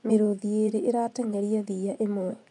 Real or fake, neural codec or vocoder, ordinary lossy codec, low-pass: fake; vocoder, 24 kHz, 100 mel bands, Vocos; none; 10.8 kHz